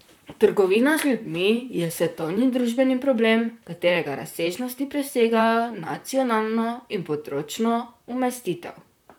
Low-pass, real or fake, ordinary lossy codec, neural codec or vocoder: none; fake; none; vocoder, 44.1 kHz, 128 mel bands, Pupu-Vocoder